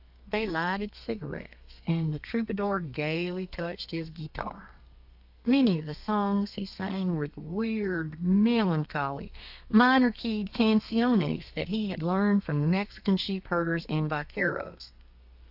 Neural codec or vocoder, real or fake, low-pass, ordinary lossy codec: codec, 32 kHz, 1.9 kbps, SNAC; fake; 5.4 kHz; AAC, 48 kbps